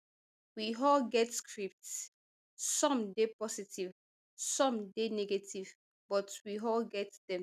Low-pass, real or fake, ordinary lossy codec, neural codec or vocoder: 14.4 kHz; real; none; none